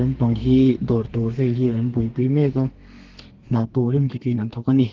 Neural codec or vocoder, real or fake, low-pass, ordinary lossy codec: codec, 32 kHz, 1.9 kbps, SNAC; fake; 7.2 kHz; Opus, 16 kbps